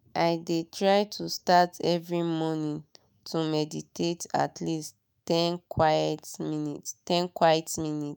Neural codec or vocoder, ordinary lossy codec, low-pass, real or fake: autoencoder, 48 kHz, 128 numbers a frame, DAC-VAE, trained on Japanese speech; none; none; fake